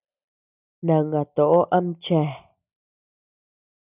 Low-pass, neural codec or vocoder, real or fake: 3.6 kHz; none; real